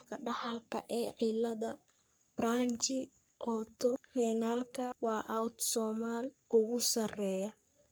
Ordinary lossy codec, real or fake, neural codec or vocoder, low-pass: none; fake; codec, 44.1 kHz, 3.4 kbps, Pupu-Codec; none